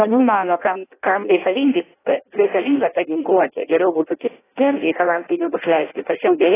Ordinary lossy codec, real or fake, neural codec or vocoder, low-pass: AAC, 16 kbps; fake; codec, 16 kHz in and 24 kHz out, 0.6 kbps, FireRedTTS-2 codec; 3.6 kHz